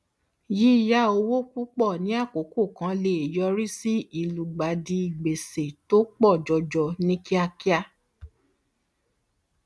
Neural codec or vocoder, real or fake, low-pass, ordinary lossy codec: none; real; none; none